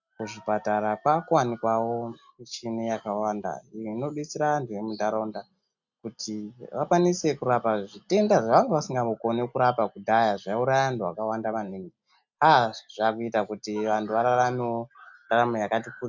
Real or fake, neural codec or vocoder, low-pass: real; none; 7.2 kHz